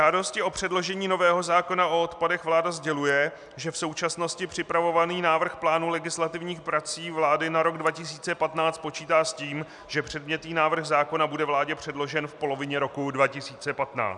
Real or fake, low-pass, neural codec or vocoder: real; 10.8 kHz; none